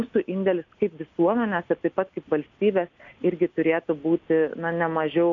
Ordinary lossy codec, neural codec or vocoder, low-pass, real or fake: AAC, 64 kbps; none; 7.2 kHz; real